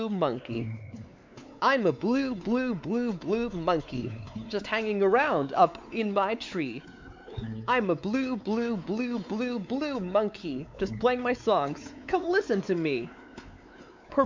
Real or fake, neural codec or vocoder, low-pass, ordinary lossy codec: fake; codec, 16 kHz, 4 kbps, X-Codec, WavLM features, trained on Multilingual LibriSpeech; 7.2 kHz; MP3, 64 kbps